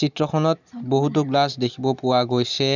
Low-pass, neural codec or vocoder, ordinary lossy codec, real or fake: 7.2 kHz; none; none; real